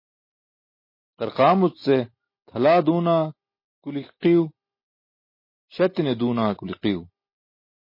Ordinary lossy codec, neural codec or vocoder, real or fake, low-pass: MP3, 24 kbps; none; real; 5.4 kHz